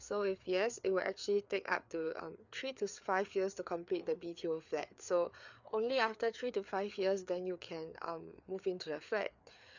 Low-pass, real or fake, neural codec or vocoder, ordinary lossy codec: 7.2 kHz; fake; codec, 16 kHz, 4 kbps, FreqCodec, larger model; none